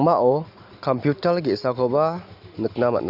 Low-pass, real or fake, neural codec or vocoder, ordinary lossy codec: 5.4 kHz; real; none; Opus, 64 kbps